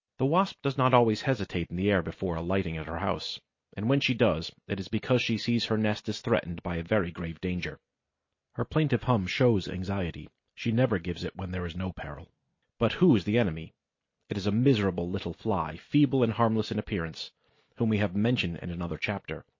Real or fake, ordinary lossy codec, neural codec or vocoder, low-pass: real; MP3, 32 kbps; none; 7.2 kHz